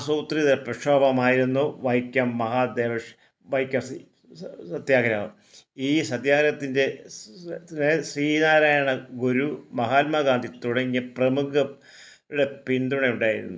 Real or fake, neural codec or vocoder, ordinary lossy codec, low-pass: real; none; none; none